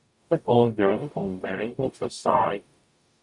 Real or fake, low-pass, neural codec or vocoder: fake; 10.8 kHz; codec, 44.1 kHz, 0.9 kbps, DAC